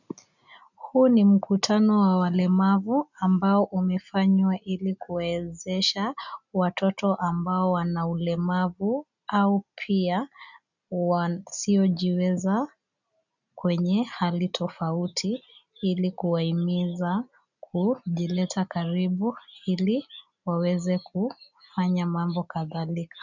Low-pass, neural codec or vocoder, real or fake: 7.2 kHz; none; real